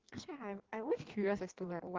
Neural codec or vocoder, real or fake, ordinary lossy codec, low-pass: codec, 16 kHz in and 24 kHz out, 1.1 kbps, FireRedTTS-2 codec; fake; Opus, 16 kbps; 7.2 kHz